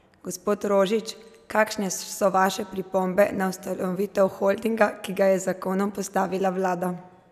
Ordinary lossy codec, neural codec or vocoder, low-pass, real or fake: none; none; 14.4 kHz; real